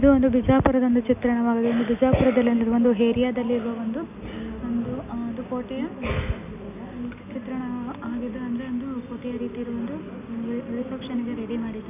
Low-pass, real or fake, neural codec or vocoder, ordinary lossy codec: 3.6 kHz; real; none; none